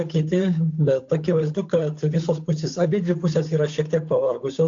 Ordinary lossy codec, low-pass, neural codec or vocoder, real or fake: AAC, 48 kbps; 7.2 kHz; codec, 16 kHz, 8 kbps, FunCodec, trained on Chinese and English, 25 frames a second; fake